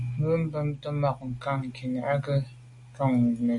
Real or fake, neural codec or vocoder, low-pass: real; none; 10.8 kHz